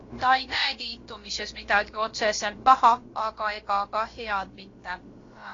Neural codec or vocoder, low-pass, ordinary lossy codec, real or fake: codec, 16 kHz, about 1 kbps, DyCAST, with the encoder's durations; 7.2 kHz; AAC, 32 kbps; fake